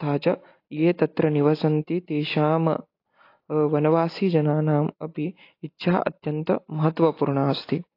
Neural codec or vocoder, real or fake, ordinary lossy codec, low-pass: none; real; AAC, 32 kbps; 5.4 kHz